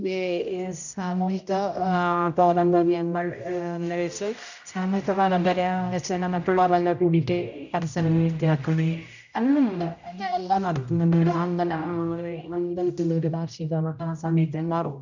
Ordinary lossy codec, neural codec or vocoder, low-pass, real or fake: none; codec, 16 kHz, 0.5 kbps, X-Codec, HuBERT features, trained on general audio; 7.2 kHz; fake